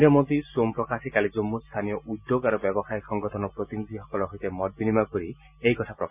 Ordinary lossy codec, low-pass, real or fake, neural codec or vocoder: none; 3.6 kHz; real; none